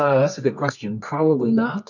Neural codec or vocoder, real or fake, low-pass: codec, 24 kHz, 0.9 kbps, WavTokenizer, medium music audio release; fake; 7.2 kHz